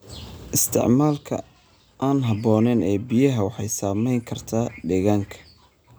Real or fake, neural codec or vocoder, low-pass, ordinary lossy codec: real; none; none; none